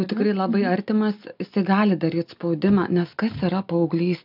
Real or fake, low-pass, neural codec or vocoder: real; 5.4 kHz; none